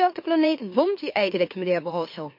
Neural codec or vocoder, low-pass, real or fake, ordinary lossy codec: autoencoder, 44.1 kHz, a latent of 192 numbers a frame, MeloTTS; 5.4 kHz; fake; MP3, 32 kbps